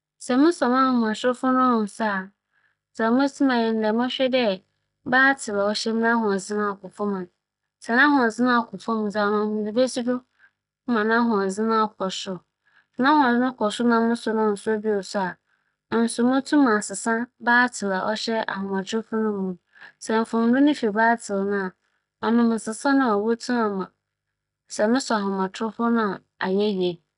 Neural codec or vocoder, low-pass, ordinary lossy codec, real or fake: none; 10.8 kHz; none; real